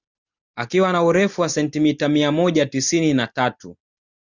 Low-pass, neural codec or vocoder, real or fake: 7.2 kHz; none; real